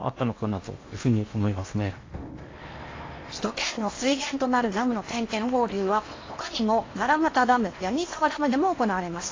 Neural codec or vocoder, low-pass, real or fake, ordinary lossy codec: codec, 16 kHz in and 24 kHz out, 0.8 kbps, FocalCodec, streaming, 65536 codes; 7.2 kHz; fake; AAC, 32 kbps